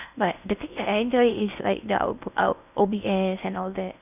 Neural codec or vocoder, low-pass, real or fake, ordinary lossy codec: codec, 16 kHz in and 24 kHz out, 0.6 kbps, FocalCodec, streaming, 4096 codes; 3.6 kHz; fake; none